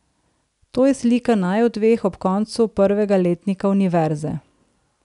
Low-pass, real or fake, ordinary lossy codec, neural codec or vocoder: 10.8 kHz; real; none; none